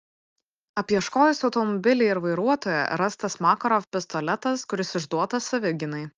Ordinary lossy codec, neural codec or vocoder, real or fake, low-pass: Opus, 24 kbps; none; real; 7.2 kHz